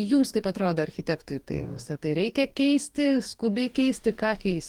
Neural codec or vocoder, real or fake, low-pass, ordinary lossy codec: codec, 44.1 kHz, 2.6 kbps, DAC; fake; 19.8 kHz; Opus, 24 kbps